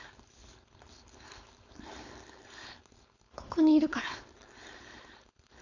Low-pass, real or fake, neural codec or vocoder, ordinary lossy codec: 7.2 kHz; fake; codec, 16 kHz, 4.8 kbps, FACodec; AAC, 48 kbps